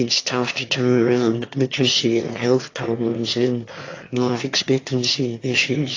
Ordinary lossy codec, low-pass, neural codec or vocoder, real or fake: AAC, 32 kbps; 7.2 kHz; autoencoder, 22.05 kHz, a latent of 192 numbers a frame, VITS, trained on one speaker; fake